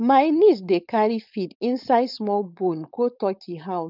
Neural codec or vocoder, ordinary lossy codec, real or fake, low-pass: codec, 16 kHz, 4.8 kbps, FACodec; none; fake; 5.4 kHz